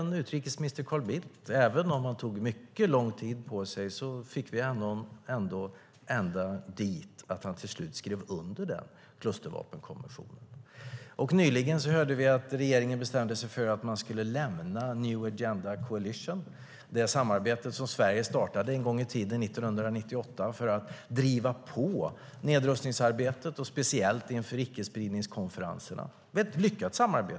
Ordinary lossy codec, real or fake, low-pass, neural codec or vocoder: none; real; none; none